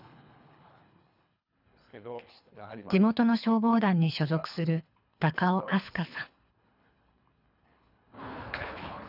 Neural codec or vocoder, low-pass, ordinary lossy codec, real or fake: codec, 24 kHz, 3 kbps, HILCodec; 5.4 kHz; none; fake